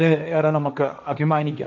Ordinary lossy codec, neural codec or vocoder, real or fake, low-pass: none; codec, 16 kHz, 1.1 kbps, Voila-Tokenizer; fake; 7.2 kHz